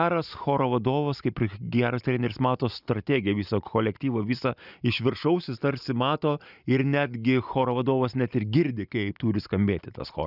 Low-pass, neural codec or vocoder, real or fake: 5.4 kHz; none; real